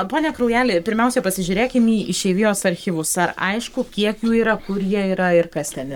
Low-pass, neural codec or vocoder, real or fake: 19.8 kHz; codec, 44.1 kHz, 7.8 kbps, Pupu-Codec; fake